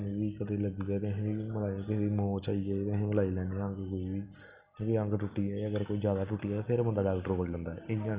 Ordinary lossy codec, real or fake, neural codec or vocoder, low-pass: Opus, 24 kbps; real; none; 3.6 kHz